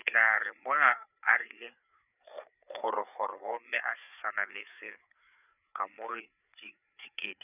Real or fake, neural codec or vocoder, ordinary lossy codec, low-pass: fake; codec, 16 kHz, 4 kbps, FreqCodec, larger model; none; 3.6 kHz